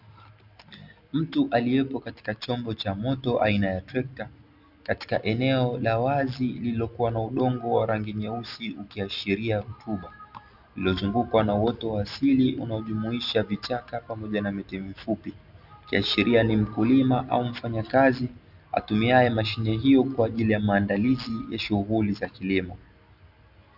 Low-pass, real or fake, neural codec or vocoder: 5.4 kHz; real; none